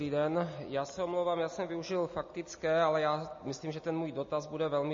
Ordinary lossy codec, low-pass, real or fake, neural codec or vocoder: MP3, 32 kbps; 7.2 kHz; real; none